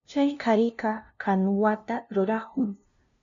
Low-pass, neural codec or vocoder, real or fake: 7.2 kHz; codec, 16 kHz, 0.5 kbps, FunCodec, trained on LibriTTS, 25 frames a second; fake